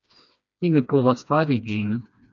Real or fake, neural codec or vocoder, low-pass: fake; codec, 16 kHz, 2 kbps, FreqCodec, smaller model; 7.2 kHz